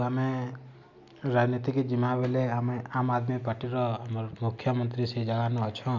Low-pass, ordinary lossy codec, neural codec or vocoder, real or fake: 7.2 kHz; MP3, 64 kbps; none; real